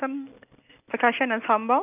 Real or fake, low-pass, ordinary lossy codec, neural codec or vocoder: real; 3.6 kHz; none; none